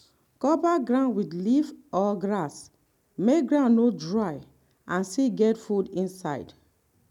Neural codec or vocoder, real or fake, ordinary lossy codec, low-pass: none; real; none; 19.8 kHz